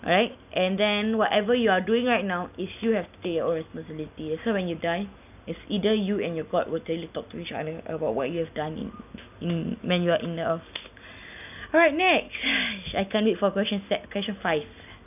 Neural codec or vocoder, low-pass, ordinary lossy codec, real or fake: none; 3.6 kHz; none; real